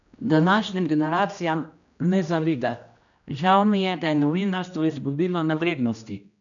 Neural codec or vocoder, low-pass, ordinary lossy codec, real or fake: codec, 16 kHz, 1 kbps, X-Codec, HuBERT features, trained on general audio; 7.2 kHz; none; fake